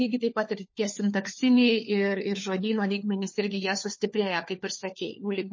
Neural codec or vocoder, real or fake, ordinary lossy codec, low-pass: codec, 16 kHz, 4 kbps, FunCodec, trained on LibriTTS, 50 frames a second; fake; MP3, 32 kbps; 7.2 kHz